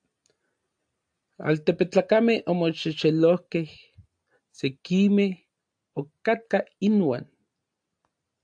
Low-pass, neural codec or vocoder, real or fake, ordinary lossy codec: 9.9 kHz; none; real; AAC, 64 kbps